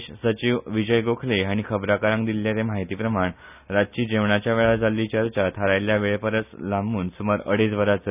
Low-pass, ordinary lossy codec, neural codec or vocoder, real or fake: 3.6 kHz; none; none; real